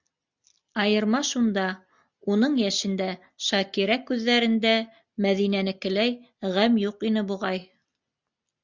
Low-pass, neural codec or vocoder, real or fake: 7.2 kHz; none; real